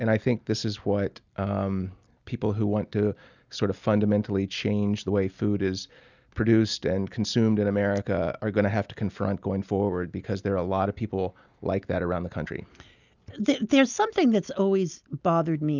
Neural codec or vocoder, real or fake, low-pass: none; real; 7.2 kHz